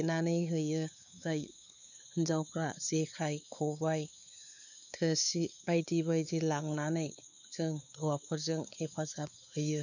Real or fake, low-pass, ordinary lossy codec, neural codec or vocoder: fake; 7.2 kHz; none; codec, 16 kHz, 4 kbps, X-Codec, WavLM features, trained on Multilingual LibriSpeech